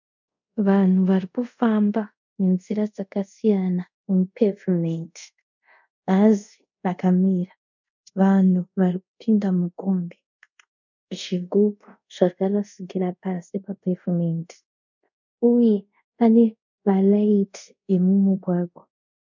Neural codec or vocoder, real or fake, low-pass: codec, 24 kHz, 0.5 kbps, DualCodec; fake; 7.2 kHz